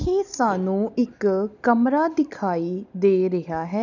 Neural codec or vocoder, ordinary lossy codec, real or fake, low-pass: none; none; real; 7.2 kHz